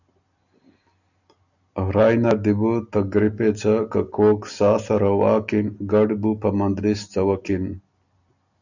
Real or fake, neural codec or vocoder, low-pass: real; none; 7.2 kHz